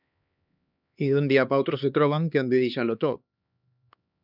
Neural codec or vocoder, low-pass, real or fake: codec, 16 kHz, 2 kbps, X-Codec, HuBERT features, trained on LibriSpeech; 5.4 kHz; fake